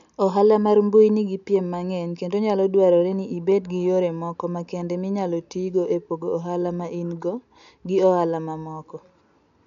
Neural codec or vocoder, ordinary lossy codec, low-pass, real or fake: none; none; 7.2 kHz; real